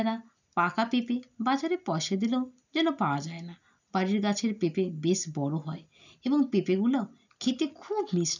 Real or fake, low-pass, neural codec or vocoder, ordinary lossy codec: real; 7.2 kHz; none; none